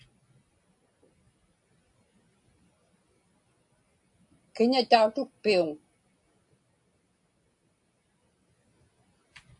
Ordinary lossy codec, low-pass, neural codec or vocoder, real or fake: AAC, 64 kbps; 10.8 kHz; none; real